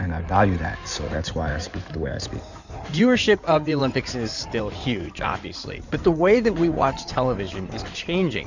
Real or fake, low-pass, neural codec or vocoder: fake; 7.2 kHz; codec, 16 kHz in and 24 kHz out, 2.2 kbps, FireRedTTS-2 codec